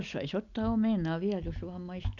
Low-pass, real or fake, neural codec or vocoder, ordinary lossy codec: 7.2 kHz; real; none; none